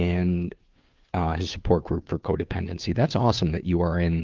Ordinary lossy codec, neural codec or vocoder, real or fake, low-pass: Opus, 32 kbps; codec, 16 kHz, 2 kbps, FunCodec, trained on Chinese and English, 25 frames a second; fake; 7.2 kHz